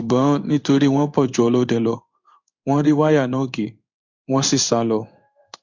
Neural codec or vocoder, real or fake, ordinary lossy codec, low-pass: codec, 16 kHz in and 24 kHz out, 1 kbps, XY-Tokenizer; fake; Opus, 64 kbps; 7.2 kHz